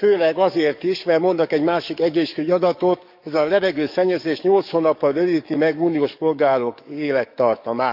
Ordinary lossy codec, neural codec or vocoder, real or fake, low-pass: none; codec, 44.1 kHz, 7.8 kbps, DAC; fake; 5.4 kHz